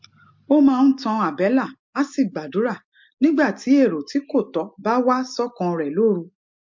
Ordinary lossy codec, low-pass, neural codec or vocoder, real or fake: MP3, 48 kbps; 7.2 kHz; none; real